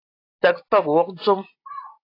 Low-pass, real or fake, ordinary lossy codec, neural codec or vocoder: 5.4 kHz; real; AAC, 32 kbps; none